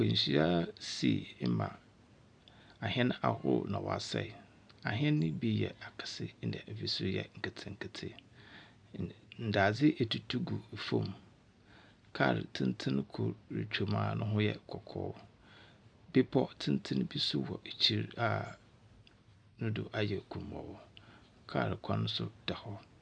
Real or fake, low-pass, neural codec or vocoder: real; 9.9 kHz; none